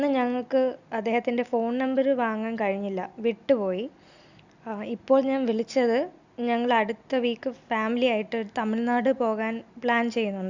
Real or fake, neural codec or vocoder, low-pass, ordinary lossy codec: real; none; 7.2 kHz; Opus, 64 kbps